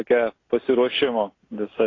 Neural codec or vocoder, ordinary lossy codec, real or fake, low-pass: none; AAC, 32 kbps; real; 7.2 kHz